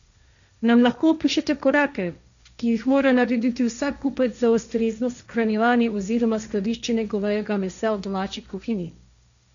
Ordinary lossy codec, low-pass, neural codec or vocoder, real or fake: none; 7.2 kHz; codec, 16 kHz, 1.1 kbps, Voila-Tokenizer; fake